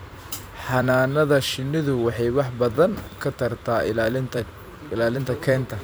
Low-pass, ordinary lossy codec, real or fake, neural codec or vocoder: none; none; fake; vocoder, 44.1 kHz, 128 mel bands every 256 samples, BigVGAN v2